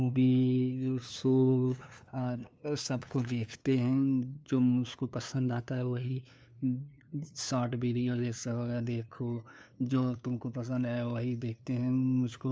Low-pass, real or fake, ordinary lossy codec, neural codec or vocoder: none; fake; none; codec, 16 kHz, 2 kbps, FunCodec, trained on LibriTTS, 25 frames a second